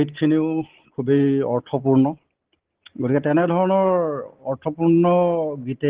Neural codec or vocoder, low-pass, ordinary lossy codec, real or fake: none; 3.6 kHz; Opus, 16 kbps; real